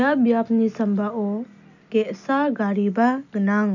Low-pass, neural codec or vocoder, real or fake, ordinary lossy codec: 7.2 kHz; none; real; none